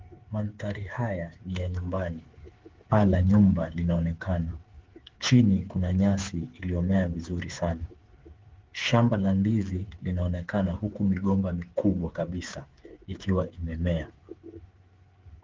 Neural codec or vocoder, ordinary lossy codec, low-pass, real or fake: codec, 16 kHz, 8 kbps, FreqCodec, smaller model; Opus, 16 kbps; 7.2 kHz; fake